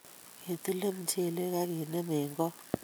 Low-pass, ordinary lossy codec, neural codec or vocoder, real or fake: none; none; none; real